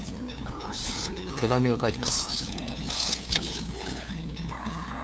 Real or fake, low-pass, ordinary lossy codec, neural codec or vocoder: fake; none; none; codec, 16 kHz, 2 kbps, FunCodec, trained on LibriTTS, 25 frames a second